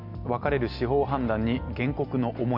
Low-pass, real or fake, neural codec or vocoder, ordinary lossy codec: 5.4 kHz; real; none; AAC, 48 kbps